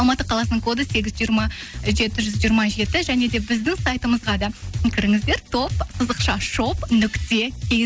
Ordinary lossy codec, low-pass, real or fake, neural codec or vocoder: none; none; real; none